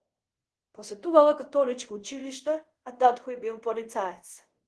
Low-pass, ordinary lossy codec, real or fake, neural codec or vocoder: 10.8 kHz; Opus, 16 kbps; fake; codec, 24 kHz, 0.5 kbps, DualCodec